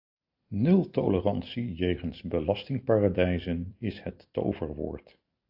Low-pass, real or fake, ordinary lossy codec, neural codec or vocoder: 5.4 kHz; real; AAC, 48 kbps; none